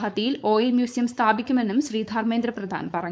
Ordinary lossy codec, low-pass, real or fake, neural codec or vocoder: none; none; fake; codec, 16 kHz, 4.8 kbps, FACodec